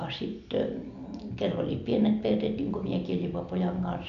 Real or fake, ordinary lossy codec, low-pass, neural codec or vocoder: real; MP3, 96 kbps; 7.2 kHz; none